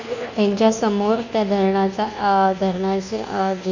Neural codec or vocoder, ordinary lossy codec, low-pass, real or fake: codec, 24 kHz, 1.2 kbps, DualCodec; none; 7.2 kHz; fake